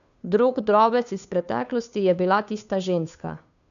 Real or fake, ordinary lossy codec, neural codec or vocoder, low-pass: fake; none; codec, 16 kHz, 2 kbps, FunCodec, trained on Chinese and English, 25 frames a second; 7.2 kHz